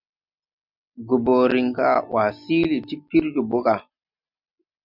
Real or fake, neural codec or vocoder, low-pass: real; none; 5.4 kHz